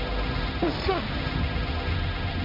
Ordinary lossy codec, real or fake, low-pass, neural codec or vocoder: MP3, 48 kbps; fake; 5.4 kHz; codec, 16 kHz, 1.1 kbps, Voila-Tokenizer